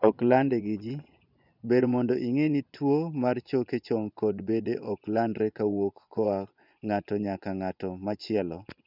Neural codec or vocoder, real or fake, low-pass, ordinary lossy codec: vocoder, 24 kHz, 100 mel bands, Vocos; fake; 5.4 kHz; none